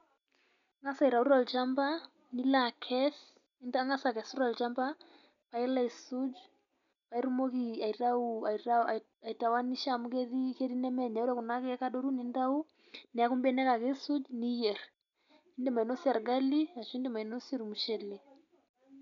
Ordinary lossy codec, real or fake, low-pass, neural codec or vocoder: none; real; 7.2 kHz; none